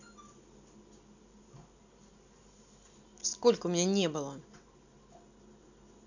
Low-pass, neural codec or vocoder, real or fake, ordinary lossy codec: 7.2 kHz; none; real; none